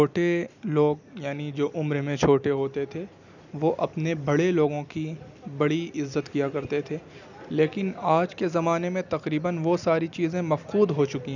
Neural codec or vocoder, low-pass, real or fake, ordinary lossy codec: none; 7.2 kHz; real; none